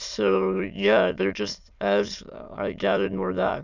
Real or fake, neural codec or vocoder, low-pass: fake; autoencoder, 22.05 kHz, a latent of 192 numbers a frame, VITS, trained on many speakers; 7.2 kHz